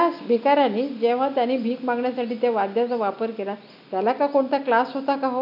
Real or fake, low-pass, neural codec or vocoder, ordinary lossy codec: real; 5.4 kHz; none; none